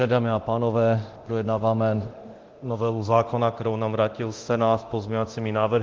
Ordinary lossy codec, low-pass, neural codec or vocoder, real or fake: Opus, 16 kbps; 7.2 kHz; codec, 24 kHz, 0.9 kbps, DualCodec; fake